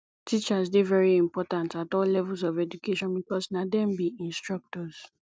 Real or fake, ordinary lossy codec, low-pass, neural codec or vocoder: real; none; none; none